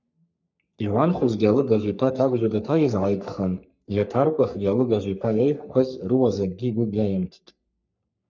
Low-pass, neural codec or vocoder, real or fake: 7.2 kHz; codec, 44.1 kHz, 3.4 kbps, Pupu-Codec; fake